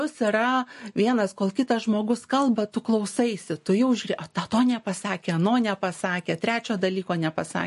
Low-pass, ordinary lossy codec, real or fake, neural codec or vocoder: 14.4 kHz; MP3, 48 kbps; real; none